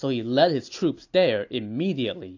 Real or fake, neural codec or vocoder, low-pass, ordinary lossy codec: real; none; 7.2 kHz; AAC, 48 kbps